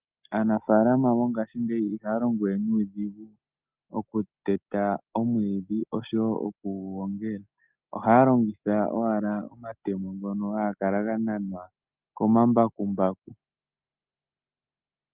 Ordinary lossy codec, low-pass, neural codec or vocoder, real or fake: Opus, 24 kbps; 3.6 kHz; none; real